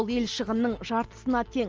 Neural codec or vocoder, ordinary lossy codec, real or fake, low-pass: none; Opus, 24 kbps; real; 7.2 kHz